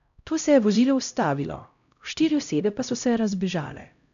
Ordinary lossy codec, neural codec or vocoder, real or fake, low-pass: none; codec, 16 kHz, 0.5 kbps, X-Codec, HuBERT features, trained on LibriSpeech; fake; 7.2 kHz